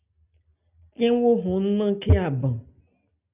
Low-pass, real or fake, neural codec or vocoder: 3.6 kHz; real; none